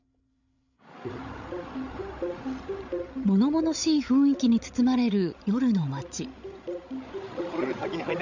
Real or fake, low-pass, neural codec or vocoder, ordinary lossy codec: fake; 7.2 kHz; codec, 16 kHz, 16 kbps, FreqCodec, larger model; none